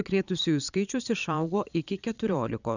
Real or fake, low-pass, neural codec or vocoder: fake; 7.2 kHz; vocoder, 22.05 kHz, 80 mel bands, Vocos